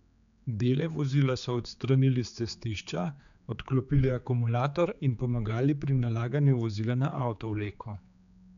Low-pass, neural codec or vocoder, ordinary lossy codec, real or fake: 7.2 kHz; codec, 16 kHz, 4 kbps, X-Codec, HuBERT features, trained on general audio; none; fake